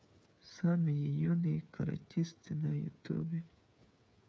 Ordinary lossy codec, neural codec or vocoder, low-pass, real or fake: none; codec, 16 kHz, 8 kbps, FreqCodec, smaller model; none; fake